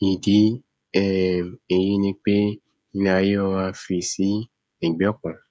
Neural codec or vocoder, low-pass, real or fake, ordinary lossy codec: codec, 16 kHz, 16 kbps, FreqCodec, smaller model; none; fake; none